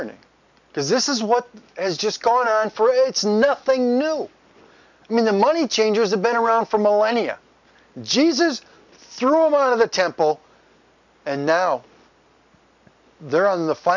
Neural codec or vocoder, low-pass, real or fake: none; 7.2 kHz; real